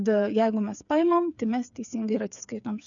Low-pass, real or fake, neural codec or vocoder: 7.2 kHz; fake; codec, 16 kHz, 8 kbps, FreqCodec, smaller model